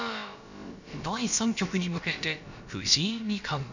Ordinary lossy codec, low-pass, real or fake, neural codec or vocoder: none; 7.2 kHz; fake; codec, 16 kHz, about 1 kbps, DyCAST, with the encoder's durations